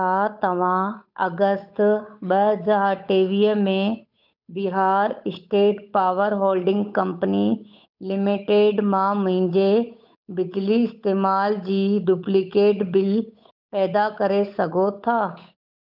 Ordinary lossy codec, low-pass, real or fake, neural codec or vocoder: none; 5.4 kHz; fake; codec, 16 kHz, 8 kbps, FunCodec, trained on Chinese and English, 25 frames a second